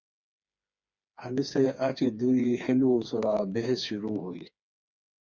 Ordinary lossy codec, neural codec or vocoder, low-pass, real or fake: Opus, 64 kbps; codec, 16 kHz, 4 kbps, FreqCodec, smaller model; 7.2 kHz; fake